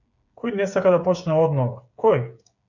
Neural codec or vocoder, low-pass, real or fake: codec, 16 kHz, 8 kbps, FreqCodec, smaller model; 7.2 kHz; fake